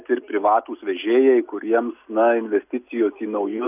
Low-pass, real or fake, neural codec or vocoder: 3.6 kHz; real; none